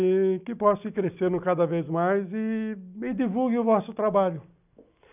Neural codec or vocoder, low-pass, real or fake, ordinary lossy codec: none; 3.6 kHz; real; none